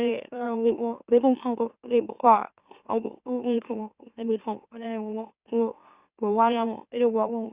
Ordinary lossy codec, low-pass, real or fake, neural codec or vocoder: Opus, 64 kbps; 3.6 kHz; fake; autoencoder, 44.1 kHz, a latent of 192 numbers a frame, MeloTTS